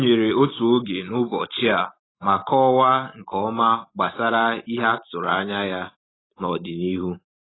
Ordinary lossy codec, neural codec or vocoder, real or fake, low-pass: AAC, 16 kbps; none; real; 7.2 kHz